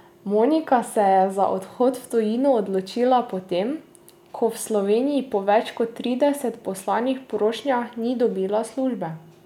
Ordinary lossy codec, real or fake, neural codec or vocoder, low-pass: none; real; none; 19.8 kHz